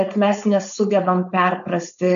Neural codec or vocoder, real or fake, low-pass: codec, 16 kHz, 4.8 kbps, FACodec; fake; 7.2 kHz